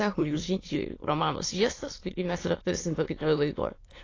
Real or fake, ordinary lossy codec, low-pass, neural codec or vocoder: fake; AAC, 32 kbps; 7.2 kHz; autoencoder, 22.05 kHz, a latent of 192 numbers a frame, VITS, trained on many speakers